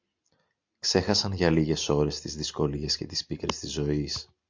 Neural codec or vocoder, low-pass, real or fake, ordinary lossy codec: none; 7.2 kHz; real; MP3, 48 kbps